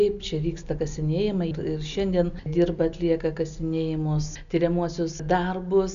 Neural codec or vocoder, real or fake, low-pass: none; real; 7.2 kHz